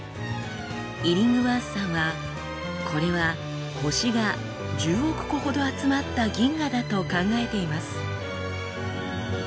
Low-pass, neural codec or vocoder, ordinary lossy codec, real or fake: none; none; none; real